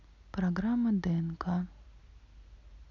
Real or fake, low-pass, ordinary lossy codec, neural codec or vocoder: real; 7.2 kHz; none; none